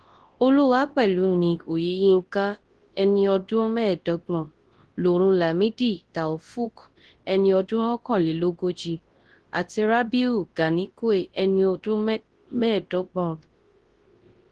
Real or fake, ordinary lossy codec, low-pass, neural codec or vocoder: fake; Opus, 16 kbps; 10.8 kHz; codec, 24 kHz, 0.9 kbps, WavTokenizer, large speech release